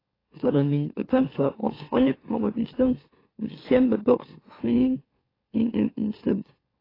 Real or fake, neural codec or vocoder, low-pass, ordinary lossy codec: fake; autoencoder, 44.1 kHz, a latent of 192 numbers a frame, MeloTTS; 5.4 kHz; AAC, 24 kbps